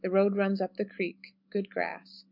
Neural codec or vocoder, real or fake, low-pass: none; real; 5.4 kHz